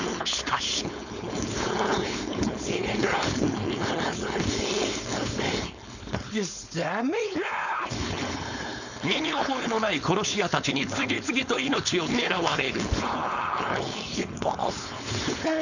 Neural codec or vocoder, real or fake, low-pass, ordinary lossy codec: codec, 16 kHz, 4.8 kbps, FACodec; fake; 7.2 kHz; none